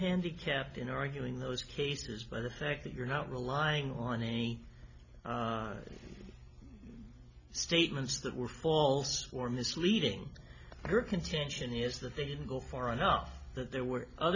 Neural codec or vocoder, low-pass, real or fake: none; 7.2 kHz; real